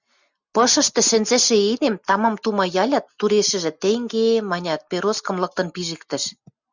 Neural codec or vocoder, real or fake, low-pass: none; real; 7.2 kHz